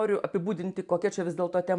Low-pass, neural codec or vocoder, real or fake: 10.8 kHz; vocoder, 44.1 kHz, 128 mel bands every 512 samples, BigVGAN v2; fake